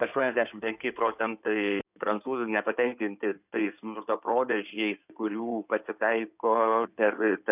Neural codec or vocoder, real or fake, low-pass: codec, 16 kHz in and 24 kHz out, 2.2 kbps, FireRedTTS-2 codec; fake; 3.6 kHz